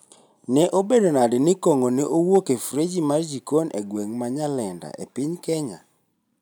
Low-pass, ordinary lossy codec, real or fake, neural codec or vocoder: none; none; real; none